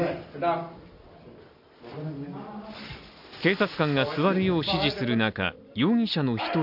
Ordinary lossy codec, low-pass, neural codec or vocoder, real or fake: none; 5.4 kHz; none; real